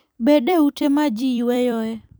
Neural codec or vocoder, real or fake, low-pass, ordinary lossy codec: vocoder, 44.1 kHz, 128 mel bands every 256 samples, BigVGAN v2; fake; none; none